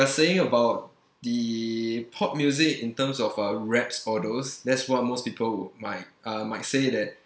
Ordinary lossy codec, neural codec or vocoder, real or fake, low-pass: none; none; real; none